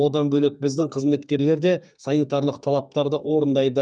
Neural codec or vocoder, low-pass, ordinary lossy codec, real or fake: codec, 32 kHz, 1.9 kbps, SNAC; 9.9 kHz; none; fake